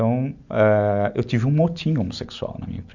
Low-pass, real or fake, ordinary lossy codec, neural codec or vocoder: 7.2 kHz; real; none; none